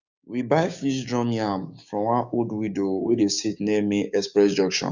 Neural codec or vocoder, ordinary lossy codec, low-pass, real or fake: codec, 16 kHz, 6 kbps, DAC; none; 7.2 kHz; fake